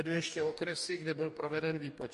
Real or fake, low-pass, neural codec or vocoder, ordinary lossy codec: fake; 14.4 kHz; codec, 44.1 kHz, 2.6 kbps, DAC; MP3, 48 kbps